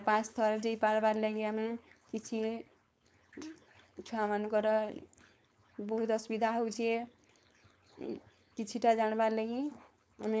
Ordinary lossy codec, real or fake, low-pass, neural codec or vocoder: none; fake; none; codec, 16 kHz, 4.8 kbps, FACodec